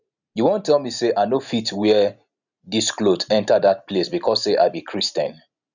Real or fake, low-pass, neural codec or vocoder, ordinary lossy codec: real; 7.2 kHz; none; none